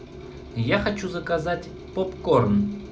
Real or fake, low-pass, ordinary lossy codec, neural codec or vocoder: real; none; none; none